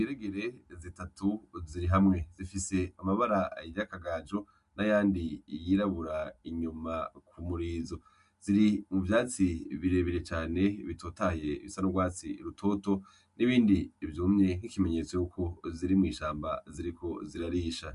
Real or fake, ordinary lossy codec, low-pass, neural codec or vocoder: real; MP3, 64 kbps; 10.8 kHz; none